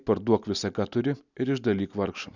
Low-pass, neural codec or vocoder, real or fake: 7.2 kHz; none; real